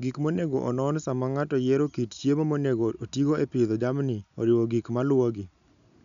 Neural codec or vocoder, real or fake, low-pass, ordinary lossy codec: none; real; 7.2 kHz; none